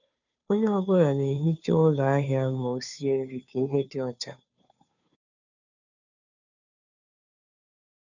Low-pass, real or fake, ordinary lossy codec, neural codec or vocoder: 7.2 kHz; fake; none; codec, 16 kHz, 2 kbps, FunCodec, trained on Chinese and English, 25 frames a second